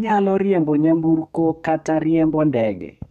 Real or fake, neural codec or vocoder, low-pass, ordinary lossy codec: fake; codec, 32 kHz, 1.9 kbps, SNAC; 14.4 kHz; MP3, 96 kbps